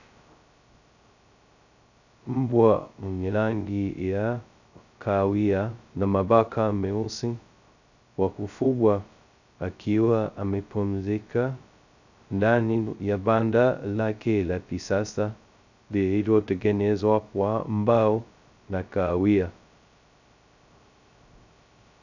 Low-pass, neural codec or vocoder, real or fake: 7.2 kHz; codec, 16 kHz, 0.2 kbps, FocalCodec; fake